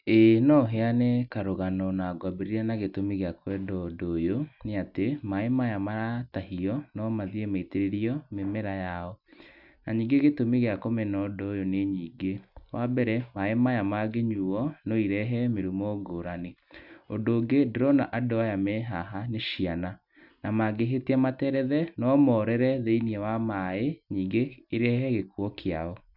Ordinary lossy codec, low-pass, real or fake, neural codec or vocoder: none; 5.4 kHz; real; none